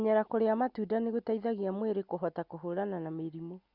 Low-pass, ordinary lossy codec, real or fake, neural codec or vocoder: 5.4 kHz; Opus, 64 kbps; real; none